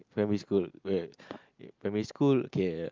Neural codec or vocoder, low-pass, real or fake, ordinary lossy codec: none; 7.2 kHz; real; Opus, 32 kbps